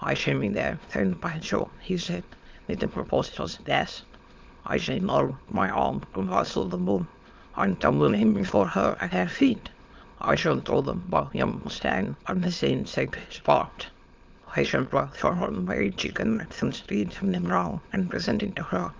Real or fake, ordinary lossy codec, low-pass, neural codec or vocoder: fake; Opus, 24 kbps; 7.2 kHz; autoencoder, 22.05 kHz, a latent of 192 numbers a frame, VITS, trained on many speakers